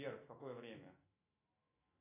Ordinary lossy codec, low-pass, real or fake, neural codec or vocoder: AAC, 24 kbps; 3.6 kHz; fake; autoencoder, 48 kHz, 128 numbers a frame, DAC-VAE, trained on Japanese speech